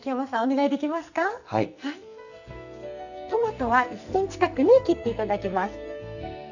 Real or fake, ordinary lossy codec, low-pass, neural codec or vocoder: fake; none; 7.2 kHz; codec, 44.1 kHz, 2.6 kbps, SNAC